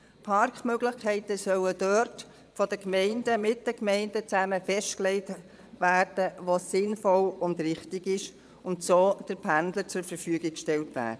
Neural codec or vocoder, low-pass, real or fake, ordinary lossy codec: vocoder, 22.05 kHz, 80 mel bands, Vocos; none; fake; none